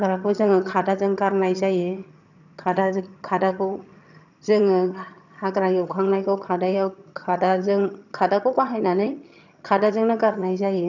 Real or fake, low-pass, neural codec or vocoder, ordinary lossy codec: fake; 7.2 kHz; vocoder, 22.05 kHz, 80 mel bands, HiFi-GAN; none